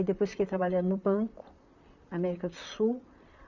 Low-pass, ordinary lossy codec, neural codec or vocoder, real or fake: 7.2 kHz; none; vocoder, 44.1 kHz, 128 mel bands, Pupu-Vocoder; fake